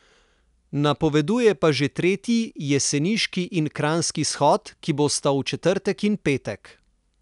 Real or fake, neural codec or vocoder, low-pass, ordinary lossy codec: real; none; 10.8 kHz; none